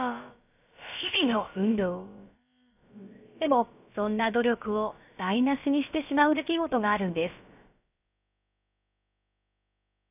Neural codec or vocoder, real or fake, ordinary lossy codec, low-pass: codec, 16 kHz, about 1 kbps, DyCAST, with the encoder's durations; fake; none; 3.6 kHz